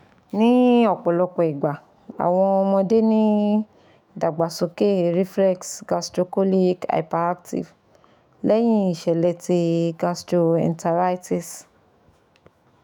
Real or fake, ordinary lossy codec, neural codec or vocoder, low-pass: fake; none; autoencoder, 48 kHz, 128 numbers a frame, DAC-VAE, trained on Japanese speech; 19.8 kHz